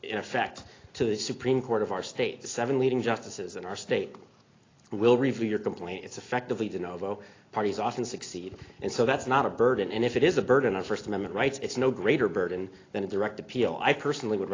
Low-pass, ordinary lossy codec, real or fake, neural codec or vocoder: 7.2 kHz; AAC, 32 kbps; real; none